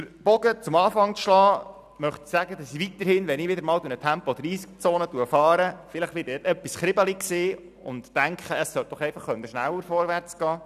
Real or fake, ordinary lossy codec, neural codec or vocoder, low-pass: real; none; none; 14.4 kHz